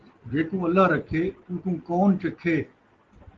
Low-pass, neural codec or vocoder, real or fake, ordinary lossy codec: 7.2 kHz; none; real; Opus, 16 kbps